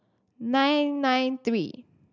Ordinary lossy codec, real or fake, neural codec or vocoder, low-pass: none; real; none; 7.2 kHz